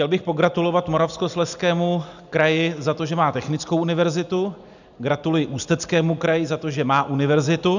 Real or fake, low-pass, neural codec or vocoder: real; 7.2 kHz; none